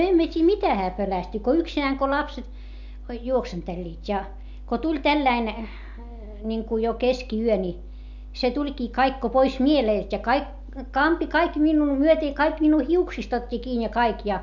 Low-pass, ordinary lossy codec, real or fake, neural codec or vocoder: 7.2 kHz; MP3, 64 kbps; real; none